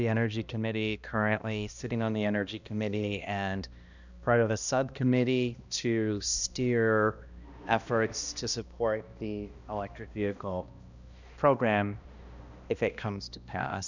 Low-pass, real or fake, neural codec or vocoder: 7.2 kHz; fake; codec, 16 kHz, 1 kbps, X-Codec, HuBERT features, trained on balanced general audio